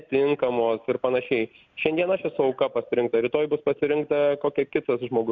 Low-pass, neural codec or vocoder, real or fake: 7.2 kHz; none; real